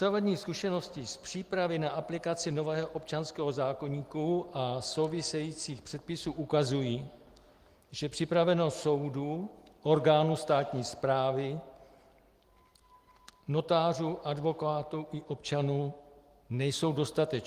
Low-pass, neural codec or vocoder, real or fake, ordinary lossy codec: 14.4 kHz; none; real; Opus, 24 kbps